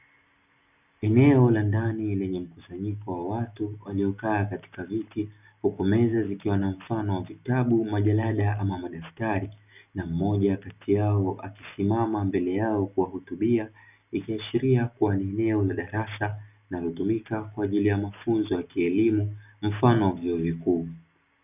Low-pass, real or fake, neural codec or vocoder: 3.6 kHz; real; none